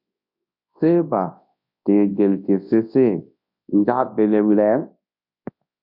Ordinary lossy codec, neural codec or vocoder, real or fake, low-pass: MP3, 48 kbps; codec, 24 kHz, 0.9 kbps, WavTokenizer, large speech release; fake; 5.4 kHz